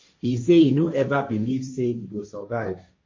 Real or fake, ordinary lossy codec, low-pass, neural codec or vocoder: fake; MP3, 32 kbps; 7.2 kHz; codec, 16 kHz, 1.1 kbps, Voila-Tokenizer